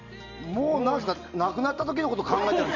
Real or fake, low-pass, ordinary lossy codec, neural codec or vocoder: real; 7.2 kHz; none; none